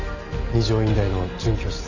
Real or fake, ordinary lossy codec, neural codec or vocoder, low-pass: real; none; none; 7.2 kHz